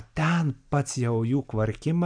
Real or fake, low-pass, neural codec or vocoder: real; 9.9 kHz; none